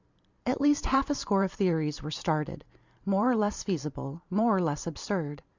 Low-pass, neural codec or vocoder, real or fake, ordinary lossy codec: 7.2 kHz; vocoder, 44.1 kHz, 128 mel bands every 512 samples, BigVGAN v2; fake; Opus, 64 kbps